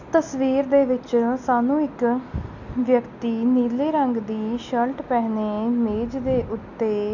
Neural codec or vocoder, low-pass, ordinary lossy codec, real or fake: none; 7.2 kHz; none; real